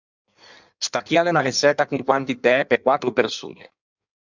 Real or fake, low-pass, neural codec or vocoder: fake; 7.2 kHz; codec, 16 kHz in and 24 kHz out, 1.1 kbps, FireRedTTS-2 codec